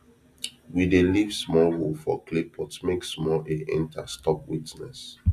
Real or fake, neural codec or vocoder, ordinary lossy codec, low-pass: real; none; AAC, 96 kbps; 14.4 kHz